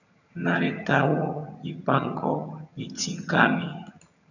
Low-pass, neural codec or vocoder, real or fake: 7.2 kHz; vocoder, 22.05 kHz, 80 mel bands, HiFi-GAN; fake